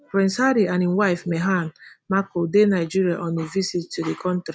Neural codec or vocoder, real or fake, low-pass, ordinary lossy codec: none; real; none; none